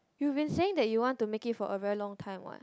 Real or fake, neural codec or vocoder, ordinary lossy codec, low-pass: real; none; none; none